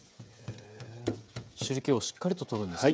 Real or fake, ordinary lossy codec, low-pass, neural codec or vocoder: fake; none; none; codec, 16 kHz, 16 kbps, FreqCodec, smaller model